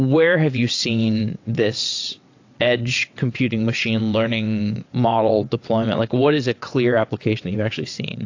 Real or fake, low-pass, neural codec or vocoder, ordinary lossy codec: fake; 7.2 kHz; vocoder, 22.05 kHz, 80 mel bands, WaveNeXt; AAC, 48 kbps